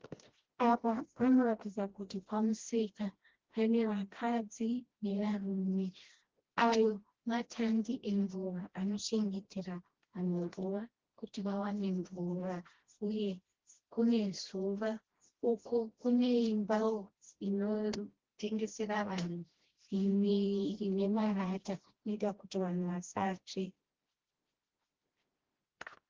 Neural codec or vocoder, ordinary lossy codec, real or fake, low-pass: codec, 16 kHz, 1 kbps, FreqCodec, smaller model; Opus, 16 kbps; fake; 7.2 kHz